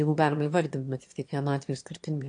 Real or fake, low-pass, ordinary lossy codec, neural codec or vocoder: fake; 9.9 kHz; AAC, 48 kbps; autoencoder, 22.05 kHz, a latent of 192 numbers a frame, VITS, trained on one speaker